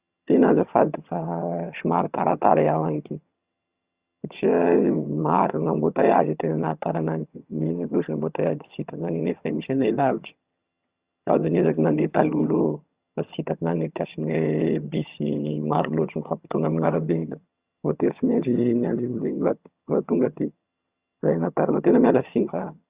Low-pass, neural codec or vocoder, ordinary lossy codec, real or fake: 3.6 kHz; vocoder, 22.05 kHz, 80 mel bands, HiFi-GAN; Opus, 64 kbps; fake